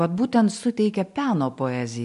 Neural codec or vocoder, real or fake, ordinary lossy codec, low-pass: none; real; MP3, 64 kbps; 10.8 kHz